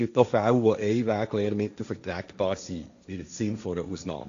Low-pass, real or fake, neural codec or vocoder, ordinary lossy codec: 7.2 kHz; fake; codec, 16 kHz, 1.1 kbps, Voila-Tokenizer; AAC, 96 kbps